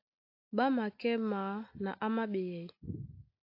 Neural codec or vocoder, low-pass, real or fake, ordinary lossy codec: none; 5.4 kHz; real; AAC, 32 kbps